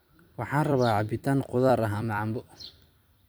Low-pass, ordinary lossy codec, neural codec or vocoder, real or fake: none; none; vocoder, 44.1 kHz, 128 mel bands every 256 samples, BigVGAN v2; fake